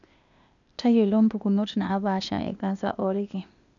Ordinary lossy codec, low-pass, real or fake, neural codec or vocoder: none; 7.2 kHz; fake; codec, 16 kHz, 0.8 kbps, ZipCodec